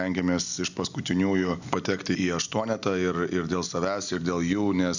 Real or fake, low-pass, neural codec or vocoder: real; 7.2 kHz; none